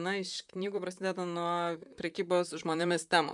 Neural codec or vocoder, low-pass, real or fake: vocoder, 44.1 kHz, 128 mel bands, Pupu-Vocoder; 10.8 kHz; fake